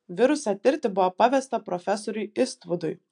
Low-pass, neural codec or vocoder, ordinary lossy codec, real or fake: 9.9 kHz; none; AAC, 64 kbps; real